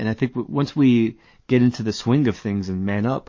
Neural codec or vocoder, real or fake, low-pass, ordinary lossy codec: codec, 44.1 kHz, 7.8 kbps, DAC; fake; 7.2 kHz; MP3, 32 kbps